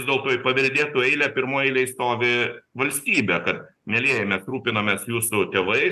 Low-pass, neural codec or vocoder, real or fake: 14.4 kHz; none; real